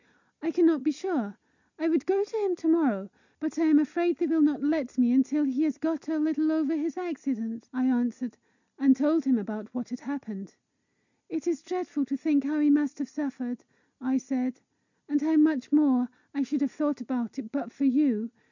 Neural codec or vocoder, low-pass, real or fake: none; 7.2 kHz; real